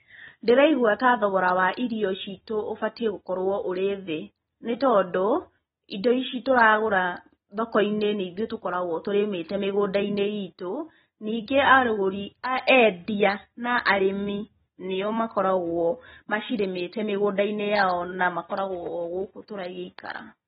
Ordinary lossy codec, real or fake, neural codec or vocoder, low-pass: AAC, 16 kbps; real; none; 19.8 kHz